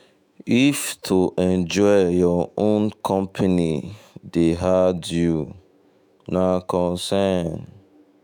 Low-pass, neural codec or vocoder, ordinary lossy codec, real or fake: none; autoencoder, 48 kHz, 128 numbers a frame, DAC-VAE, trained on Japanese speech; none; fake